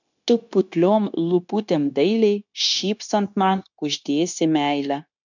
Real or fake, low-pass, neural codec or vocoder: fake; 7.2 kHz; codec, 16 kHz, 0.9 kbps, LongCat-Audio-Codec